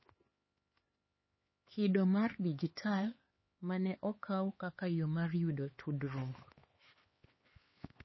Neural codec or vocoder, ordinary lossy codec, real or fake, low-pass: codec, 16 kHz, 4 kbps, X-Codec, HuBERT features, trained on LibriSpeech; MP3, 24 kbps; fake; 7.2 kHz